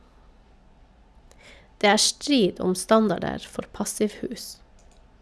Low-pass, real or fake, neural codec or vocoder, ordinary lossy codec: none; real; none; none